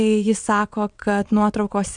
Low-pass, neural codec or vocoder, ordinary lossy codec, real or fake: 9.9 kHz; vocoder, 22.05 kHz, 80 mel bands, WaveNeXt; MP3, 96 kbps; fake